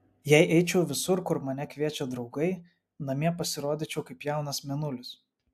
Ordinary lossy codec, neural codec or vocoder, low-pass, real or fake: AAC, 96 kbps; none; 14.4 kHz; real